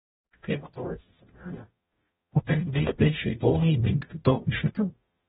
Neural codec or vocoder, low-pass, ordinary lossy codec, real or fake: codec, 44.1 kHz, 0.9 kbps, DAC; 19.8 kHz; AAC, 16 kbps; fake